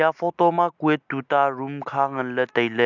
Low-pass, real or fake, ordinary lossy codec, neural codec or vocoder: 7.2 kHz; real; none; none